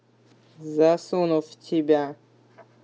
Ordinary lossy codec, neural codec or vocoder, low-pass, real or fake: none; none; none; real